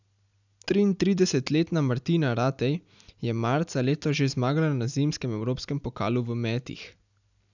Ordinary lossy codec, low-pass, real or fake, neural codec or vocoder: none; 7.2 kHz; real; none